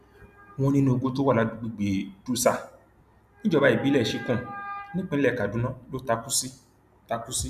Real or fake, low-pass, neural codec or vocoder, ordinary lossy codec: real; 14.4 kHz; none; none